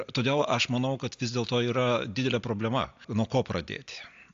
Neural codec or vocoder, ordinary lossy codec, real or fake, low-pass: none; AAC, 64 kbps; real; 7.2 kHz